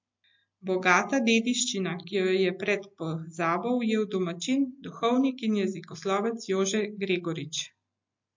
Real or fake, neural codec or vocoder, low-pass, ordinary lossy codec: real; none; 7.2 kHz; MP3, 48 kbps